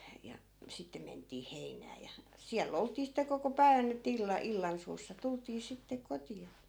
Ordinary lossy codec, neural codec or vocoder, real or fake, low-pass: none; none; real; none